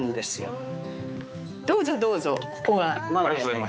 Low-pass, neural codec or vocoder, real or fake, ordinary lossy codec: none; codec, 16 kHz, 4 kbps, X-Codec, HuBERT features, trained on balanced general audio; fake; none